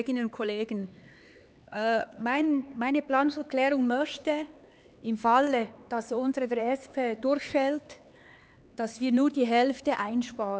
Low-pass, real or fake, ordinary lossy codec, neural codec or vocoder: none; fake; none; codec, 16 kHz, 4 kbps, X-Codec, HuBERT features, trained on LibriSpeech